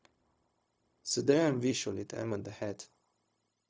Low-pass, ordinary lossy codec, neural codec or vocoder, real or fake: none; none; codec, 16 kHz, 0.4 kbps, LongCat-Audio-Codec; fake